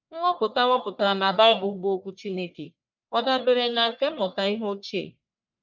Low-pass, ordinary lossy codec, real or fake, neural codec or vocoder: 7.2 kHz; none; fake; codec, 44.1 kHz, 1.7 kbps, Pupu-Codec